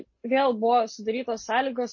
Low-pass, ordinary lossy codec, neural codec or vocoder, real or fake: 7.2 kHz; MP3, 32 kbps; none; real